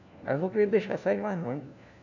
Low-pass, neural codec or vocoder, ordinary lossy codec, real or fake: 7.2 kHz; codec, 16 kHz, 1 kbps, FunCodec, trained on LibriTTS, 50 frames a second; none; fake